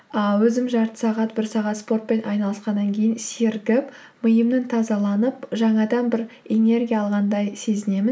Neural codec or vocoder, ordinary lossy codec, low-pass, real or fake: none; none; none; real